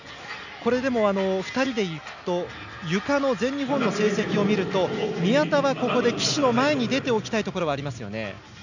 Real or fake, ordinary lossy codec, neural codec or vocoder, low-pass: real; none; none; 7.2 kHz